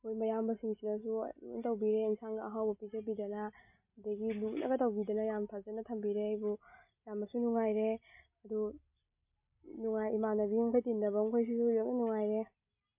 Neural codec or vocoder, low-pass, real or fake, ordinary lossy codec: none; 3.6 kHz; real; MP3, 32 kbps